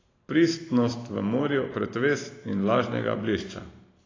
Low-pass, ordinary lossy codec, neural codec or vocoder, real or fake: 7.2 kHz; AAC, 32 kbps; vocoder, 44.1 kHz, 128 mel bands every 512 samples, BigVGAN v2; fake